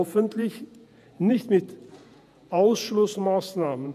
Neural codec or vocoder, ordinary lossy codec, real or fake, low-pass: vocoder, 48 kHz, 128 mel bands, Vocos; none; fake; 14.4 kHz